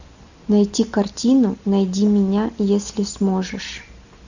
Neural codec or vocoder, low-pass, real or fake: none; 7.2 kHz; real